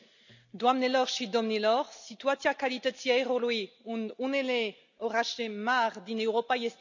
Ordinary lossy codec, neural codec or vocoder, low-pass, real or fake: none; none; 7.2 kHz; real